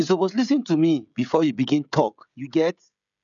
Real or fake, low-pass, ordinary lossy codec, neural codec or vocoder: fake; 7.2 kHz; none; codec, 16 kHz, 16 kbps, FreqCodec, smaller model